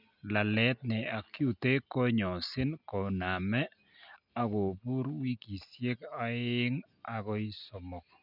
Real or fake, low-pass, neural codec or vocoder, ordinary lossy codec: real; 5.4 kHz; none; none